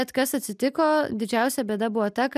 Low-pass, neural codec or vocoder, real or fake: 14.4 kHz; none; real